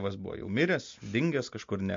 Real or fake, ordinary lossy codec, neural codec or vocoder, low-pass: real; MP3, 48 kbps; none; 7.2 kHz